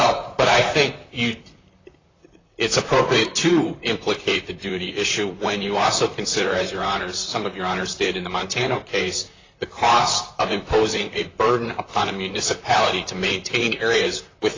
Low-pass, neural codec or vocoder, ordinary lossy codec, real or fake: 7.2 kHz; vocoder, 44.1 kHz, 128 mel bands, Pupu-Vocoder; AAC, 32 kbps; fake